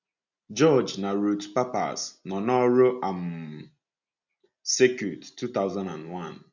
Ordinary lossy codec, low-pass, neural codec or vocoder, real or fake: none; 7.2 kHz; none; real